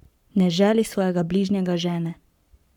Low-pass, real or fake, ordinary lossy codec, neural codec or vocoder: 19.8 kHz; fake; none; codec, 44.1 kHz, 7.8 kbps, Pupu-Codec